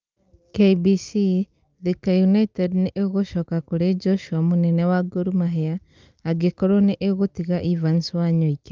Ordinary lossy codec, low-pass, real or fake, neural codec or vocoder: Opus, 24 kbps; 7.2 kHz; real; none